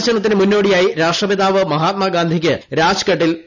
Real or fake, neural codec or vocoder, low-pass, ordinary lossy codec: real; none; 7.2 kHz; none